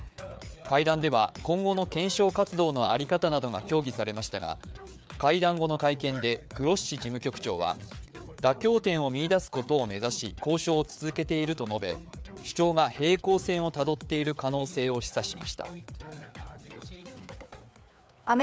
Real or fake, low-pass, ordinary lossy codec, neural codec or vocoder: fake; none; none; codec, 16 kHz, 4 kbps, FreqCodec, larger model